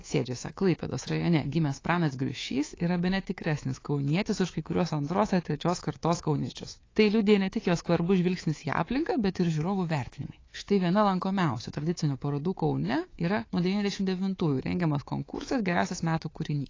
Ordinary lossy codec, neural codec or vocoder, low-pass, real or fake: AAC, 32 kbps; codec, 24 kHz, 3.1 kbps, DualCodec; 7.2 kHz; fake